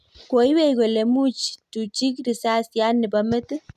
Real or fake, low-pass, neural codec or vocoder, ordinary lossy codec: real; 14.4 kHz; none; none